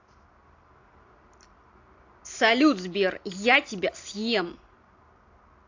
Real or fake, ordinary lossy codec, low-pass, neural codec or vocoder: real; AAC, 48 kbps; 7.2 kHz; none